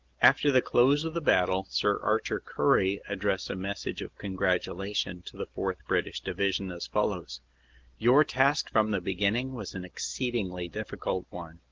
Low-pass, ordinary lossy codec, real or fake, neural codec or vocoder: 7.2 kHz; Opus, 16 kbps; real; none